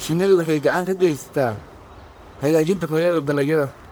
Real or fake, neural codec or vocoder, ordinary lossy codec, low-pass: fake; codec, 44.1 kHz, 1.7 kbps, Pupu-Codec; none; none